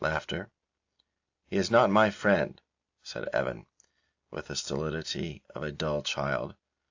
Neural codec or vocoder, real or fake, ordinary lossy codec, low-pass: none; real; AAC, 48 kbps; 7.2 kHz